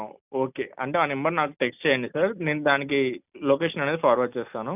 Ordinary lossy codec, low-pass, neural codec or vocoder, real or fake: none; 3.6 kHz; none; real